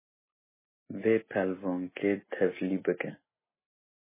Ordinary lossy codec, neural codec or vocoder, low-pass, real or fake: MP3, 16 kbps; none; 3.6 kHz; real